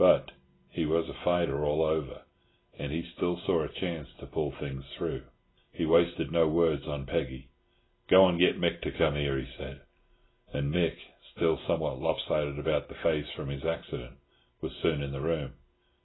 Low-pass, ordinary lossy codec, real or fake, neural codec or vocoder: 7.2 kHz; AAC, 16 kbps; real; none